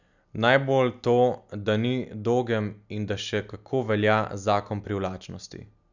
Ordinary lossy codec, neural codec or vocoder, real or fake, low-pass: none; none; real; 7.2 kHz